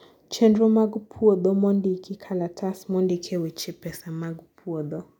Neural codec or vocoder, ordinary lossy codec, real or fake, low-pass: none; none; real; 19.8 kHz